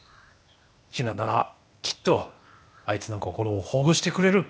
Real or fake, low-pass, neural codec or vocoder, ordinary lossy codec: fake; none; codec, 16 kHz, 0.8 kbps, ZipCodec; none